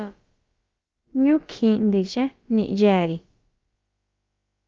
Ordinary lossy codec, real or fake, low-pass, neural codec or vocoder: Opus, 24 kbps; fake; 7.2 kHz; codec, 16 kHz, about 1 kbps, DyCAST, with the encoder's durations